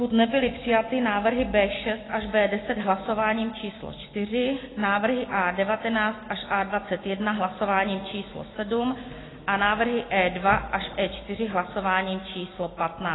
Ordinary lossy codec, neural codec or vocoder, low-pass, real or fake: AAC, 16 kbps; none; 7.2 kHz; real